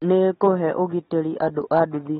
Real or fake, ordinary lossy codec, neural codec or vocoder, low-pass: real; AAC, 16 kbps; none; 7.2 kHz